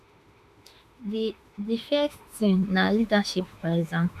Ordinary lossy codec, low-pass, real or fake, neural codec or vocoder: none; 14.4 kHz; fake; autoencoder, 48 kHz, 32 numbers a frame, DAC-VAE, trained on Japanese speech